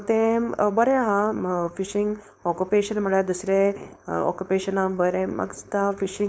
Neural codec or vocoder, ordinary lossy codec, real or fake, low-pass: codec, 16 kHz, 4.8 kbps, FACodec; none; fake; none